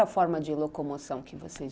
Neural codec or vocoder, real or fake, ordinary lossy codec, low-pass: none; real; none; none